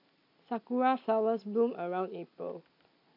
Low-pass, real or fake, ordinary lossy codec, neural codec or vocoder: 5.4 kHz; real; AAC, 32 kbps; none